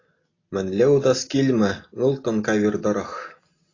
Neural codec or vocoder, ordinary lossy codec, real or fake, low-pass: none; AAC, 32 kbps; real; 7.2 kHz